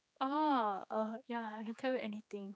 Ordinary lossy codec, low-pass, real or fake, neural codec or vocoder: none; none; fake; codec, 16 kHz, 4 kbps, X-Codec, HuBERT features, trained on general audio